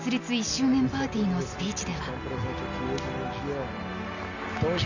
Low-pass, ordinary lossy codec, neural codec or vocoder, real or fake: 7.2 kHz; none; none; real